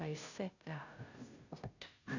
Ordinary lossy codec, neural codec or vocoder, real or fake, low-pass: none; codec, 16 kHz, 0.5 kbps, FunCodec, trained on Chinese and English, 25 frames a second; fake; 7.2 kHz